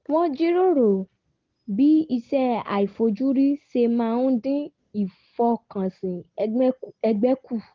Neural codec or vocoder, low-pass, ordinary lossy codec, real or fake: none; 7.2 kHz; Opus, 16 kbps; real